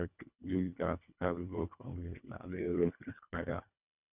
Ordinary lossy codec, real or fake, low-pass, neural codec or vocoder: none; fake; 3.6 kHz; codec, 24 kHz, 1.5 kbps, HILCodec